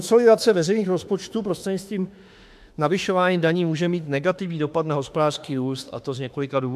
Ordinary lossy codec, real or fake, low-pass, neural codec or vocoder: AAC, 96 kbps; fake; 14.4 kHz; autoencoder, 48 kHz, 32 numbers a frame, DAC-VAE, trained on Japanese speech